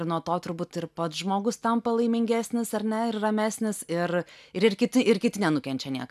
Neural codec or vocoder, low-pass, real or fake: none; 14.4 kHz; real